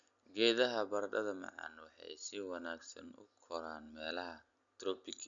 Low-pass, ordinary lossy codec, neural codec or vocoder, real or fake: 7.2 kHz; none; none; real